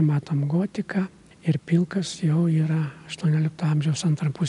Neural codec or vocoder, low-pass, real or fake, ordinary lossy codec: none; 10.8 kHz; real; MP3, 96 kbps